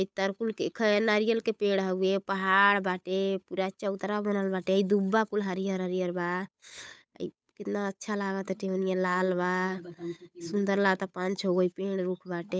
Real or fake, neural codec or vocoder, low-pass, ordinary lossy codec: fake; codec, 16 kHz, 8 kbps, FunCodec, trained on Chinese and English, 25 frames a second; none; none